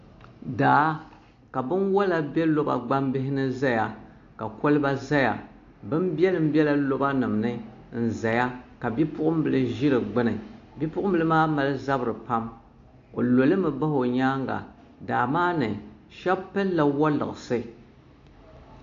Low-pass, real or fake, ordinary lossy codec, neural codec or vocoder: 7.2 kHz; real; AAC, 48 kbps; none